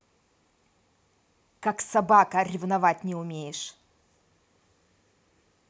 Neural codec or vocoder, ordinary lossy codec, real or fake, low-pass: none; none; real; none